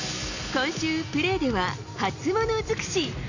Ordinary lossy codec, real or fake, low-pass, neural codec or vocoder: none; real; 7.2 kHz; none